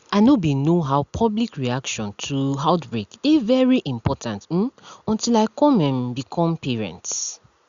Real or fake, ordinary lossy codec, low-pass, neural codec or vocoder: real; Opus, 64 kbps; 7.2 kHz; none